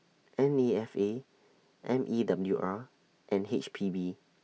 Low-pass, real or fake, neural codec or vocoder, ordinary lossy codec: none; real; none; none